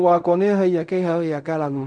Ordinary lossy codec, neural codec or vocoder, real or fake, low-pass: none; codec, 16 kHz in and 24 kHz out, 0.4 kbps, LongCat-Audio-Codec, fine tuned four codebook decoder; fake; 9.9 kHz